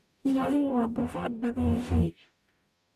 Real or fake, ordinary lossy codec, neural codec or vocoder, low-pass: fake; none; codec, 44.1 kHz, 0.9 kbps, DAC; 14.4 kHz